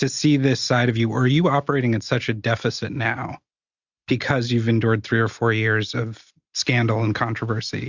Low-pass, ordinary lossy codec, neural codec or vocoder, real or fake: 7.2 kHz; Opus, 64 kbps; none; real